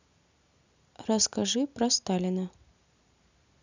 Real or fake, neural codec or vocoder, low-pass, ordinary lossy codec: real; none; 7.2 kHz; none